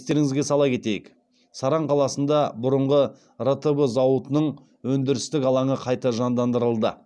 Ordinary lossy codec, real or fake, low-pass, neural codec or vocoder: none; real; none; none